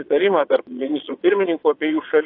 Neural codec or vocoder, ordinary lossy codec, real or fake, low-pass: vocoder, 22.05 kHz, 80 mel bands, Vocos; AAC, 32 kbps; fake; 5.4 kHz